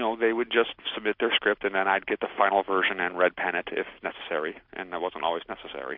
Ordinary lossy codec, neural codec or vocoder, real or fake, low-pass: MP3, 48 kbps; none; real; 5.4 kHz